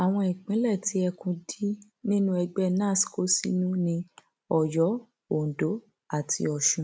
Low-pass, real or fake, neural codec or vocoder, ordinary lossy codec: none; real; none; none